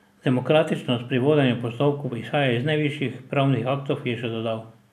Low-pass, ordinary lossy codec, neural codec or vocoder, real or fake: 14.4 kHz; none; none; real